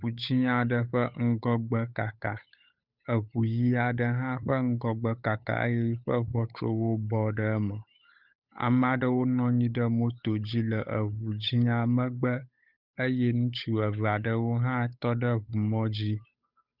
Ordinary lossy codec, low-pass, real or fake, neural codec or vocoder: Opus, 64 kbps; 5.4 kHz; fake; codec, 16 kHz, 8 kbps, FunCodec, trained on Chinese and English, 25 frames a second